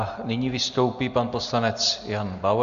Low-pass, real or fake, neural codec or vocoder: 7.2 kHz; real; none